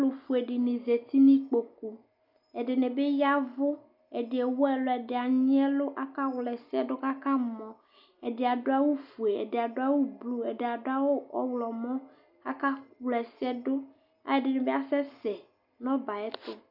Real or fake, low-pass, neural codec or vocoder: real; 5.4 kHz; none